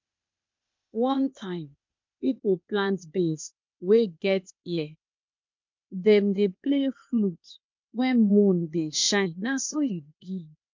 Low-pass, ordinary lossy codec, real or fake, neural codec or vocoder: 7.2 kHz; MP3, 64 kbps; fake; codec, 16 kHz, 0.8 kbps, ZipCodec